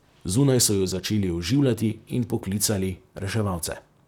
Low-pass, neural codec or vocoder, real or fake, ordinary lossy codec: 19.8 kHz; vocoder, 44.1 kHz, 128 mel bands, Pupu-Vocoder; fake; none